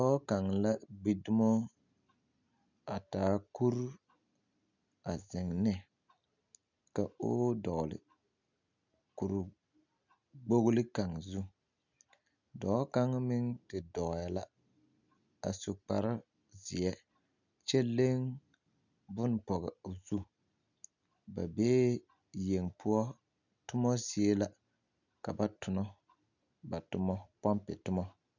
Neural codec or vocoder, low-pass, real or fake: none; 7.2 kHz; real